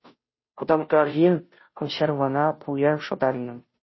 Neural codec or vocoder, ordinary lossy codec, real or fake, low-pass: codec, 16 kHz, 0.5 kbps, FunCodec, trained on Chinese and English, 25 frames a second; MP3, 24 kbps; fake; 7.2 kHz